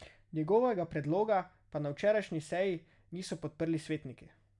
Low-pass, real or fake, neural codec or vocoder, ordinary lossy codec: 10.8 kHz; real; none; none